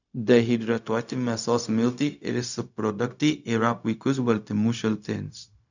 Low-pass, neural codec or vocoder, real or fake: 7.2 kHz; codec, 16 kHz, 0.4 kbps, LongCat-Audio-Codec; fake